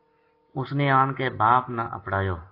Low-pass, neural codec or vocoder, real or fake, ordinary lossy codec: 5.4 kHz; none; real; AAC, 32 kbps